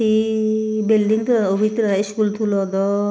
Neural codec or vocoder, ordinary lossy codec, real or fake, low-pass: none; none; real; none